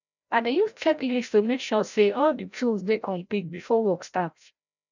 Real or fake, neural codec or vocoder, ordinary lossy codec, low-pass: fake; codec, 16 kHz, 0.5 kbps, FreqCodec, larger model; none; 7.2 kHz